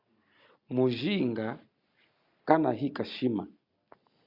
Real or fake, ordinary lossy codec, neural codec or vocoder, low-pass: real; Opus, 64 kbps; none; 5.4 kHz